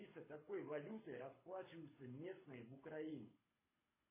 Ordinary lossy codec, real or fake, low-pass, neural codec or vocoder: MP3, 16 kbps; fake; 3.6 kHz; codec, 24 kHz, 6 kbps, HILCodec